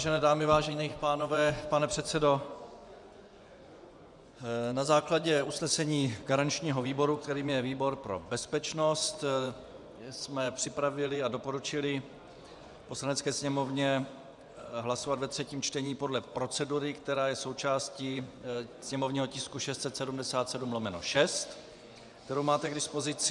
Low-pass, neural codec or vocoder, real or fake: 10.8 kHz; vocoder, 24 kHz, 100 mel bands, Vocos; fake